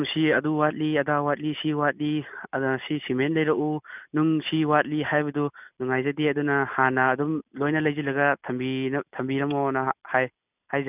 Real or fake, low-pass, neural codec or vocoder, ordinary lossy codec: real; 3.6 kHz; none; none